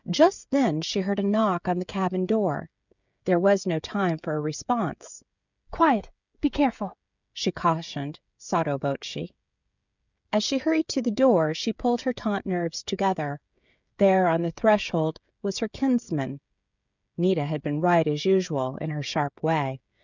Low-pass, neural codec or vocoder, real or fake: 7.2 kHz; codec, 16 kHz, 16 kbps, FreqCodec, smaller model; fake